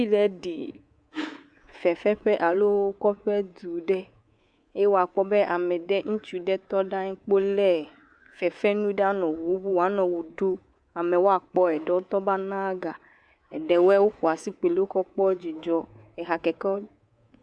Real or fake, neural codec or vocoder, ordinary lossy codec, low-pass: fake; codec, 24 kHz, 3.1 kbps, DualCodec; Opus, 64 kbps; 9.9 kHz